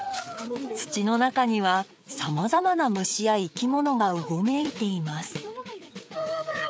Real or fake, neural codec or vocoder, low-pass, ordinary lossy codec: fake; codec, 16 kHz, 4 kbps, FreqCodec, larger model; none; none